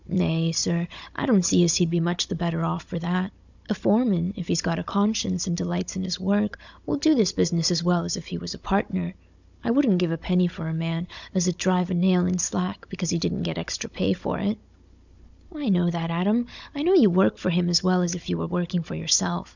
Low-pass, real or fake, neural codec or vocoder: 7.2 kHz; fake; codec, 16 kHz, 16 kbps, FunCodec, trained on Chinese and English, 50 frames a second